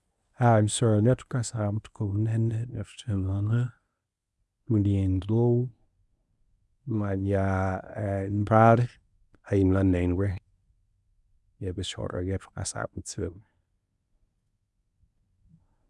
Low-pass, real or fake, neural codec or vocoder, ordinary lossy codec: none; fake; codec, 24 kHz, 0.9 kbps, WavTokenizer, medium speech release version 1; none